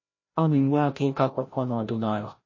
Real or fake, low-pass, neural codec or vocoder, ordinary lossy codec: fake; 7.2 kHz; codec, 16 kHz, 0.5 kbps, FreqCodec, larger model; MP3, 32 kbps